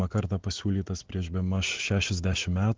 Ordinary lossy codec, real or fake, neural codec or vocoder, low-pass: Opus, 16 kbps; real; none; 7.2 kHz